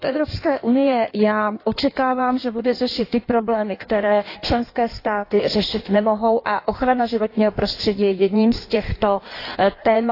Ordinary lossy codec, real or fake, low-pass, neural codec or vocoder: AAC, 32 kbps; fake; 5.4 kHz; codec, 16 kHz in and 24 kHz out, 1.1 kbps, FireRedTTS-2 codec